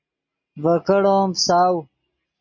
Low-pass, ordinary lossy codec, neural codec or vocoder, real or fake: 7.2 kHz; MP3, 32 kbps; none; real